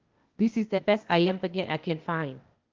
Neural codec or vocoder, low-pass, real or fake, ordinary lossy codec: codec, 16 kHz, 0.8 kbps, ZipCodec; 7.2 kHz; fake; Opus, 32 kbps